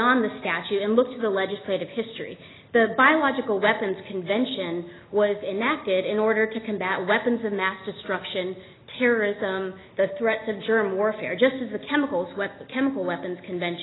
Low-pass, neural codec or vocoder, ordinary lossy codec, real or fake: 7.2 kHz; none; AAC, 16 kbps; real